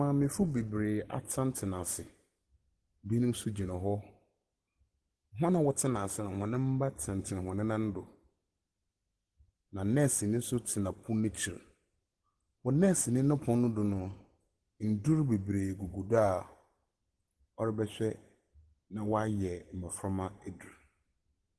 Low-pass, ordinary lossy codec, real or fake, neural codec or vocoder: 10.8 kHz; Opus, 16 kbps; real; none